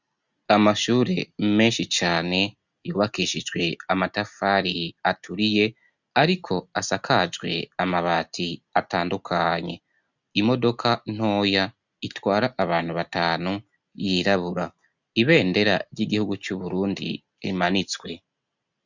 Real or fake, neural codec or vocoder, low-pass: real; none; 7.2 kHz